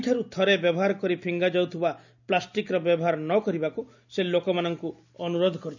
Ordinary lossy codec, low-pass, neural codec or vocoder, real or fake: none; 7.2 kHz; none; real